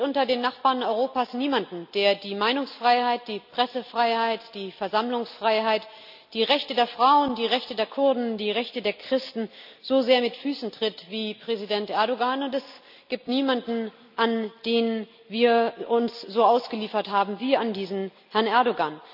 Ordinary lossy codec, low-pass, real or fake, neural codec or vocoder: none; 5.4 kHz; real; none